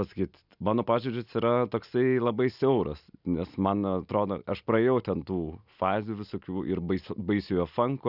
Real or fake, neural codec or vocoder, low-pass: real; none; 5.4 kHz